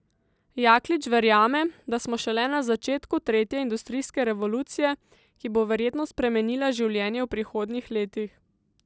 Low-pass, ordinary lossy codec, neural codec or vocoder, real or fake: none; none; none; real